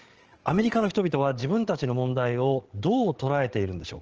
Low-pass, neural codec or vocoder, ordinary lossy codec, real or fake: 7.2 kHz; codec, 16 kHz, 8 kbps, FreqCodec, larger model; Opus, 16 kbps; fake